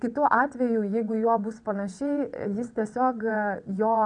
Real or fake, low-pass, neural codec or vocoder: fake; 9.9 kHz; vocoder, 22.05 kHz, 80 mel bands, WaveNeXt